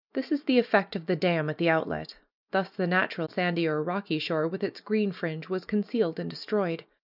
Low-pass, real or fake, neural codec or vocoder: 5.4 kHz; real; none